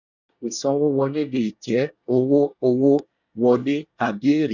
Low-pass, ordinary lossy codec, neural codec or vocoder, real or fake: 7.2 kHz; none; codec, 24 kHz, 1 kbps, SNAC; fake